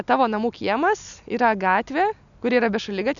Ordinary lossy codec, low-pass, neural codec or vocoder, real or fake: MP3, 96 kbps; 7.2 kHz; none; real